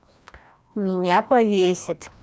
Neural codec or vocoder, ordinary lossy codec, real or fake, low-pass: codec, 16 kHz, 1 kbps, FreqCodec, larger model; none; fake; none